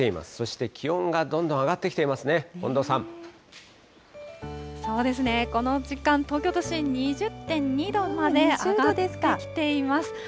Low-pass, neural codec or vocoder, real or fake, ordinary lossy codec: none; none; real; none